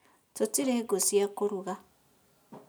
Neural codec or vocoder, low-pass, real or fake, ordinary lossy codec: none; none; real; none